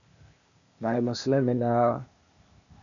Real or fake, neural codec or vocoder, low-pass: fake; codec, 16 kHz, 0.8 kbps, ZipCodec; 7.2 kHz